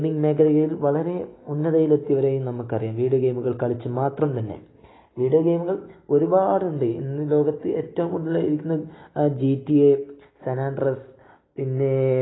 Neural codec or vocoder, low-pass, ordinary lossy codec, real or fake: none; 7.2 kHz; AAC, 16 kbps; real